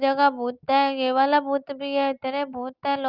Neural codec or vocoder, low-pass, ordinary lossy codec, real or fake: none; 5.4 kHz; Opus, 24 kbps; real